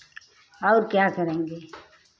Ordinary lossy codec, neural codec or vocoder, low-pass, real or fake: none; none; none; real